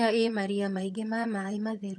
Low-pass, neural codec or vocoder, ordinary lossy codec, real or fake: none; vocoder, 22.05 kHz, 80 mel bands, HiFi-GAN; none; fake